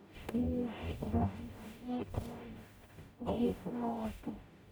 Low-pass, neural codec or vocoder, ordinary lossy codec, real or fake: none; codec, 44.1 kHz, 0.9 kbps, DAC; none; fake